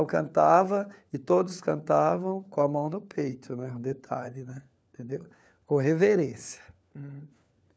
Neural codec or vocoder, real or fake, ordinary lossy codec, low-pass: codec, 16 kHz, 4 kbps, FunCodec, trained on LibriTTS, 50 frames a second; fake; none; none